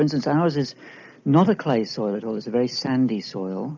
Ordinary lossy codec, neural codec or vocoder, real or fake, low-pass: MP3, 64 kbps; none; real; 7.2 kHz